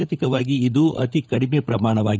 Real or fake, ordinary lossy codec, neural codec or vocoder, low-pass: fake; none; codec, 16 kHz, 16 kbps, FunCodec, trained on LibriTTS, 50 frames a second; none